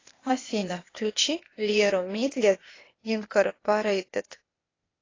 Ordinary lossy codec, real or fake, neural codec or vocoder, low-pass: AAC, 32 kbps; fake; codec, 16 kHz, 0.8 kbps, ZipCodec; 7.2 kHz